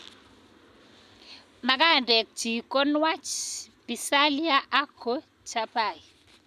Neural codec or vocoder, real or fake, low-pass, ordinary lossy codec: none; real; 14.4 kHz; none